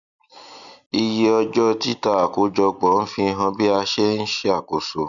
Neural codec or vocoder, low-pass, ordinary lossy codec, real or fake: none; 7.2 kHz; none; real